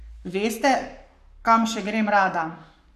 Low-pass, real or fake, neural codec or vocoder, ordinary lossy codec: 14.4 kHz; fake; codec, 44.1 kHz, 7.8 kbps, Pupu-Codec; none